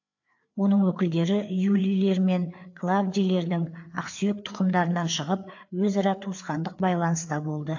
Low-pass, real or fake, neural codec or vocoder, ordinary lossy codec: 7.2 kHz; fake; codec, 16 kHz, 4 kbps, FreqCodec, larger model; AAC, 48 kbps